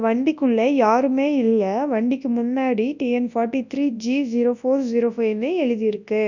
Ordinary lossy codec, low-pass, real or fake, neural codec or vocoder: none; 7.2 kHz; fake; codec, 24 kHz, 0.9 kbps, WavTokenizer, large speech release